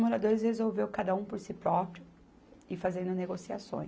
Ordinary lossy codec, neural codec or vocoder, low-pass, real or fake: none; none; none; real